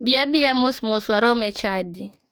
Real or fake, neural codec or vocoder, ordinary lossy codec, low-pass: fake; codec, 44.1 kHz, 2.6 kbps, DAC; none; none